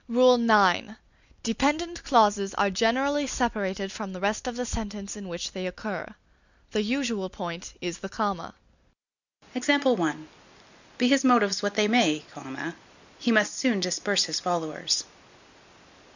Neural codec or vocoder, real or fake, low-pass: none; real; 7.2 kHz